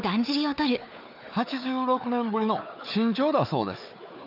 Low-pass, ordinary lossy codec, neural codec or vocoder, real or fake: 5.4 kHz; none; codec, 16 kHz, 4 kbps, FunCodec, trained on Chinese and English, 50 frames a second; fake